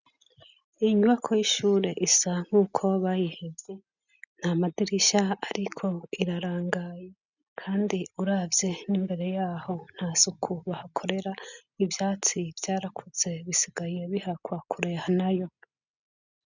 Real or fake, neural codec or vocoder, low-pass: real; none; 7.2 kHz